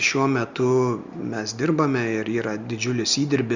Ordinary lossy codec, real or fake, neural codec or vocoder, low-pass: Opus, 64 kbps; real; none; 7.2 kHz